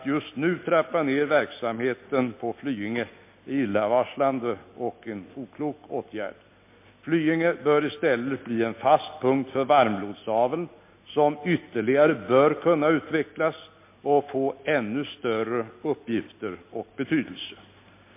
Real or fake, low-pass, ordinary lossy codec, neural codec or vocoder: real; 3.6 kHz; MP3, 24 kbps; none